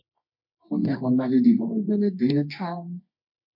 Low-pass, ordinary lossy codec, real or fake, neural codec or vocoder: 5.4 kHz; MP3, 32 kbps; fake; codec, 24 kHz, 0.9 kbps, WavTokenizer, medium music audio release